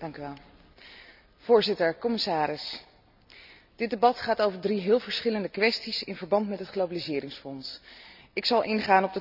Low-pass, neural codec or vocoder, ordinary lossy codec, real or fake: 5.4 kHz; none; none; real